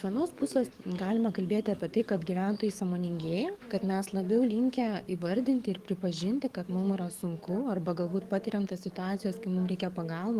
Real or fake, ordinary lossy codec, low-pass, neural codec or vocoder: fake; Opus, 24 kbps; 14.4 kHz; codec, 44.1 kHz, 7.8 kbps, DAC